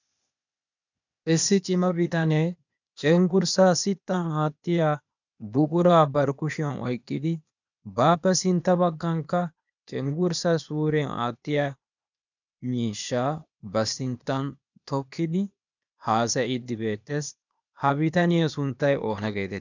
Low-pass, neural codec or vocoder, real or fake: 7.2 kHz; codec, 16 kHz, 0.8 kbps, ZipCodec; fake